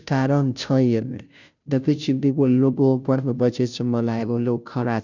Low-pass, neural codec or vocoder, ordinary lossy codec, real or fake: 7.2 kHz; codec, 16 kHz, 0.5 kbps, FunCodec, trained on Chinese and English, 25 frames a second; none; fake